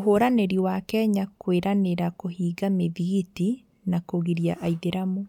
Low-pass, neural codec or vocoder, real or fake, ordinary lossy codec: 19.8 kHz; none; real; none